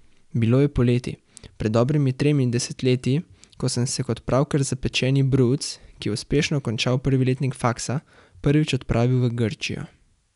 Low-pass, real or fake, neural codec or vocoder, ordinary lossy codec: 10.8 kHz; real; none; none